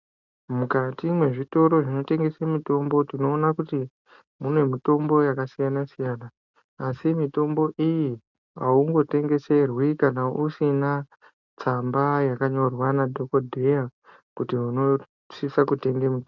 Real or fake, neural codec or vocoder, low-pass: real; none; 7.2 kHz